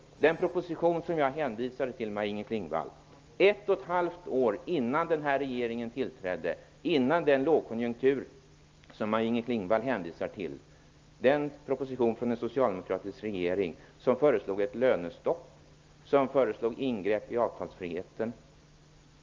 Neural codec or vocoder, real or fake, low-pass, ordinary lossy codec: none; real; 7.2 kHz; Opus, 24 kbps